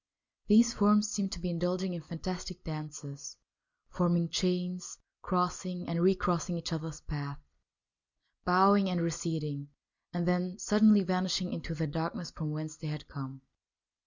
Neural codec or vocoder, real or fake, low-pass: none; real; 7.2 kHz